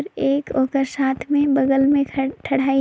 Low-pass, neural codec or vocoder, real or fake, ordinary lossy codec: none; none; real; none